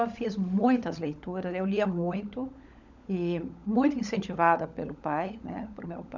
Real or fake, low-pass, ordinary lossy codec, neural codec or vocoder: fake; 7.2 kHz; none; codec, 16 kHz, 16 kbps, FunCodec, trained on LibriTTS, 50 frames a second